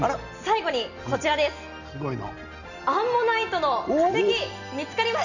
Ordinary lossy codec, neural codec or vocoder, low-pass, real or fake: none; none; 7.2 kHz; real